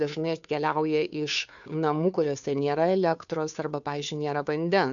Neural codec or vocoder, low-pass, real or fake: codec, 16 kHz, 2 kbps, FunCodec, trained on Chinese and English, 25 frames a second; 7.2 kHz; fake